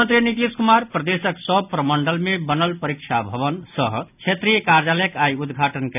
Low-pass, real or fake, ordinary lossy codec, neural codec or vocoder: 3.6 kHz; real; none; none